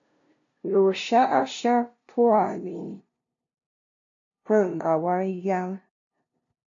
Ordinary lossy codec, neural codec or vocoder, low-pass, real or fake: AAC, 48 kbps; codec, 16 kHz, 0.5 kbps, FunCodec, trained on LibriTTS, 25 frames a second; 7.2 kHz; fake